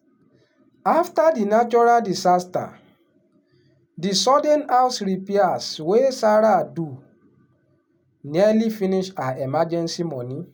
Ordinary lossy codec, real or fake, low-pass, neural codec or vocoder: none; real; 19.8 kHz; none